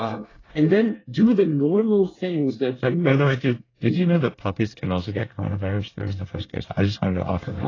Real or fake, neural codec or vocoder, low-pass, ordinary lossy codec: fake; codec, 24 kHz, 1 kbps, SNAC; 7.2 kHz; AAC, 32 kbps